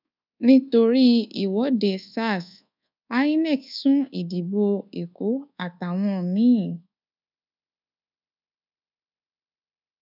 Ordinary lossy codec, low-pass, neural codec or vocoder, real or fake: none; 5.4 kHz; codec, 24 kHz, 1.2 kbps, DualCodec; fake